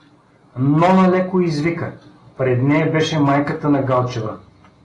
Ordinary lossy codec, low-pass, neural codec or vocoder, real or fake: AAC, 32 kbps; 10.8 kHz; none; real